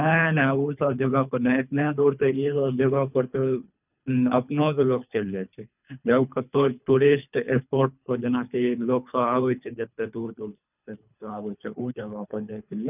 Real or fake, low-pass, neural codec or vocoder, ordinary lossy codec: fake; 3.6 kHz; codec, 24 kHz, 3 kbps, HILCodec; none